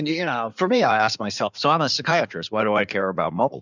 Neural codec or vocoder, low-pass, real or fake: codec, 16 kHz in and 24 kHz out, 2.2 kbps, FireRedTTS-2 codec; 7.2 kHz; fake